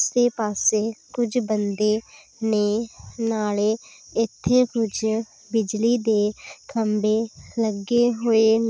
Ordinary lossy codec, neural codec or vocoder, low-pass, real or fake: none; none; none; real